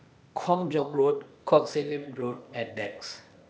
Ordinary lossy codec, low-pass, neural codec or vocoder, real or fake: none; none; codec, 16 kHz, 0.8 kbps, ZipCodec; fake